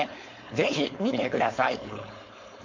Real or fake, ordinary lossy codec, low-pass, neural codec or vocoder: fake; MP3, 48 kbps; 7.2 kHz; codec, 16 kHz, 4.8 kbps, FACodec